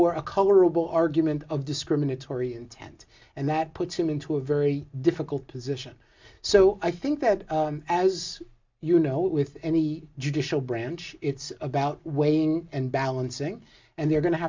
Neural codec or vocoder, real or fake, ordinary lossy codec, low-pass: none; real; MP3, 64 kbps; 7.2 kHz